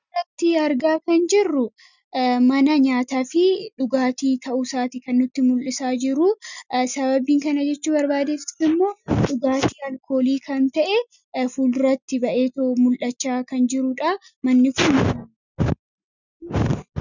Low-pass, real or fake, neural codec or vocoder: 7.2 kHz; real; none